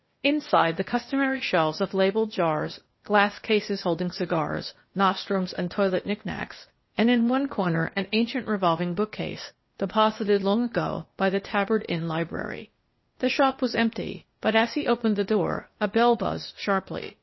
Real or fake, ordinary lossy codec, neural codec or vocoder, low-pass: fake; MP3, 24 kbps; codec, 16 kHz, 0.8 kbps, ZipCodec; 7.2 kHz